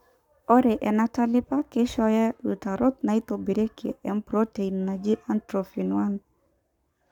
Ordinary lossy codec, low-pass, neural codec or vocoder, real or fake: none; 19.8 kHz; codec, 44.1 kHz, 7.8 kbps, Pupu-Codec; fake